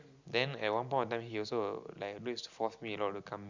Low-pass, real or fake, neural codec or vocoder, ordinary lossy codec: 7.2 kHz; real; none; Opus, 64 kbps